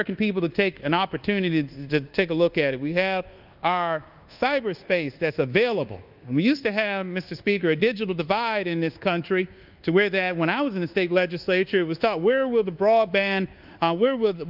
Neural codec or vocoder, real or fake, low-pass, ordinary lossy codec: codec, 24 kHz, 1.2 kbps, DualCodec; fake; 5.4 kHz; Opus, 24 kbps